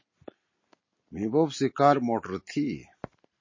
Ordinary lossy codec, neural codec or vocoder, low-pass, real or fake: MP3, 32 kbps; none; 7.2 kHz; real